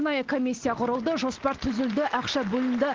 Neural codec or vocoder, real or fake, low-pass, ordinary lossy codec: none; real; 7.2 kHz; Opus, 16 kbps